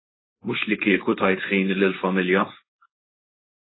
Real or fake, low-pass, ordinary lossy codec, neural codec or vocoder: fake; 7.2 kHz; AAC, 16 kbps; codec, 16 kHz, 4.8 kbps, FACodec